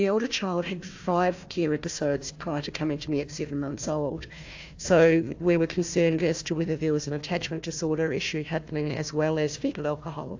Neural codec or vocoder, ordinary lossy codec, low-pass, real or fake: codec, 16 kHz, 1 kbps, FunCodec, trained on Chinese and English, 50 frames a second; AAC, 48 kbps; 7.2 kHz; fake